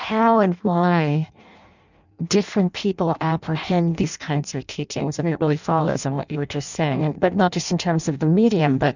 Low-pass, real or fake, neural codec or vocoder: 7.2 kHz; fake; codec, 16 kHz in and 24 kHz out, 0.6 kbps, FireRedTTS-2 codec